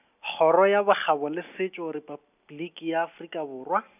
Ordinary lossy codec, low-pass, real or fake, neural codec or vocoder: none; 3.6 kHz; real; none